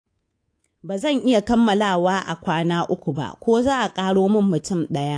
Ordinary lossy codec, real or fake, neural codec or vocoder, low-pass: AAC, 48 kbps; fake; codec, 24 kHz, 3.1 kbps, DualCodec; 9.9 kHz